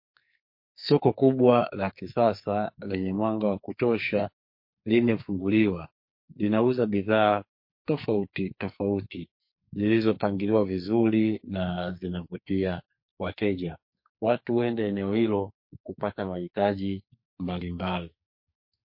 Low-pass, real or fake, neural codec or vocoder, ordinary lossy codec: 5.4 kHz; fake; codec, 44.1 kHz, 2.6 kbps, SNAC; MP3, 32 kbps